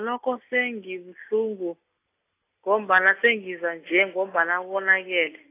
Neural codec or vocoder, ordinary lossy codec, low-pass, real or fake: none; AAC, 24 kbps; 3.6 kHz; real